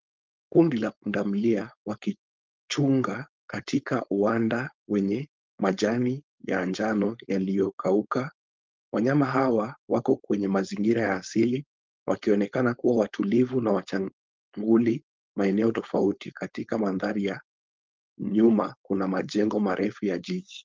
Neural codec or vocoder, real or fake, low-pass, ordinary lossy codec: codec, 16 kHz, 4.8 kbps, FACodec; fake; 7.2 kHz; Opus, 24 kbps